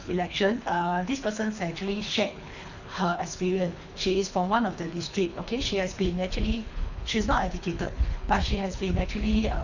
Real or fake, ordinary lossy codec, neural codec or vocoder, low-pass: fake; none; codec, 24 kHz, 3 kbps, HILCodec; 7.2 kHz